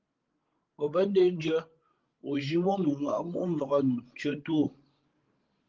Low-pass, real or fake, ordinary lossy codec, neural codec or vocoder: 7.2 kHz; fake; Opus, 16 kbps; codec, 16 kHz, 16 kbps, FreqCodec, larger model